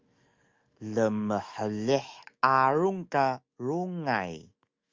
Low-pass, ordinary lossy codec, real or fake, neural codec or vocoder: 7.2 kHz; Opus, 32 kbps; fake; autoencoder, 48 kHz, 128 numbers a frame, DAC-VAE, trained on Japanese speech